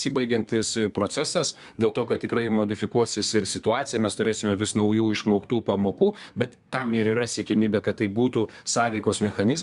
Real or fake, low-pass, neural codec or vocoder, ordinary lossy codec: fake; 10.8 kHz; codec, 24 kHz, 1 kbps, SNAC; Opus, 64 kbps